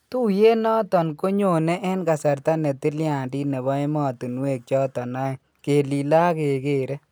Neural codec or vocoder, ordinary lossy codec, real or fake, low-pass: none; none; real; none